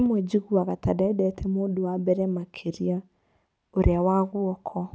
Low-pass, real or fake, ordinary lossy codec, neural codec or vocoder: none; real; none; none